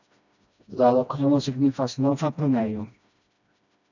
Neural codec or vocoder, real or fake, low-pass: codec, 16 kHz, 1 kbps, FreqCodec, smaller model; fake; 7.2 kHz